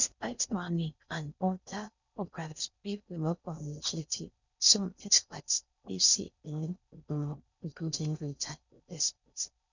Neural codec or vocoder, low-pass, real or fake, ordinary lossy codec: codec, 16 kHz in and 24 kHz out, 0.6 kbps, FocalCodec, streaming, 4096 codes; 7.2 kHz; fake; none